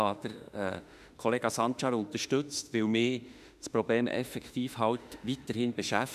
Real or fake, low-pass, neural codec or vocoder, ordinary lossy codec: fake; 14.4 kHz; autoencoder, 48 kHz, 32 numbers a frame, DAC-VAE, trained on Japanese speech; none